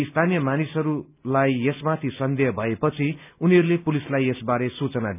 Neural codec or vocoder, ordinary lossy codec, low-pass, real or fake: none; none; 3.6 kHz; real